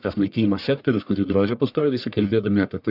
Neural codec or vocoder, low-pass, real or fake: codec, 44.1 kHz, 1.7 kbps, Pupu-Codec; 5.4 kHz; fake